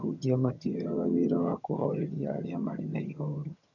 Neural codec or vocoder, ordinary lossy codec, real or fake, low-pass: vocoder, 22.05 kHz, 80 mel bands, HiFi-GAN; none; fake; 7.2 kHz